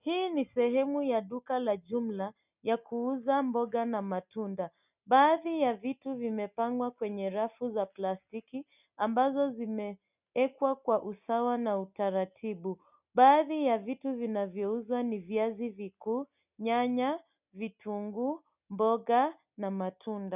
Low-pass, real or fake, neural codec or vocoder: 3.6 kHz; real; none